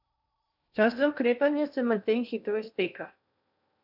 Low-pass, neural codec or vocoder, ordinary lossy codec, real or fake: 5.4 kHz; codec, 16 kHz in and 24 kHz out, 0.8 kbps, FocalCodec, streaming, 65536 codes; none; fake